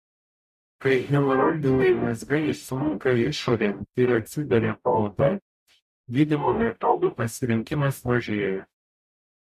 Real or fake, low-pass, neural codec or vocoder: fake; 14.4 kHz; codec, 44.1 kHz, 0.9 kbps, DAC